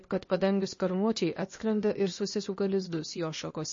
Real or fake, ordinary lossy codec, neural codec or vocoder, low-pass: fake; MP3, 32 kbps; codec, 16 kHz, 0.8 kbps, ZipCodec; 7.2 kHz